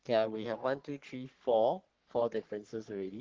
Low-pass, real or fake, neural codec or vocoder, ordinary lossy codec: 7.2 kHz; fake; codec, 44.1 kHz, 3.4 kbps, Pupu-Codec; Opus, 16 kbps